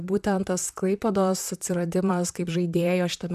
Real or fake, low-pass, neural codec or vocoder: fake; 14.4 kHz; vocoder, 44.1 kHz, 128 mel bands, Pupu-Vocoder